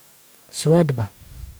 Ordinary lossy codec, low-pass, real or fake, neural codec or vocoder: none; none; fake; codec, 44.1 kHz, 2.6 kbps, DAC